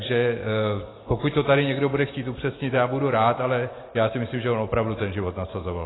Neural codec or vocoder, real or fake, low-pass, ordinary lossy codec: none; real; 7.2 kHz; AAC, 16 kbps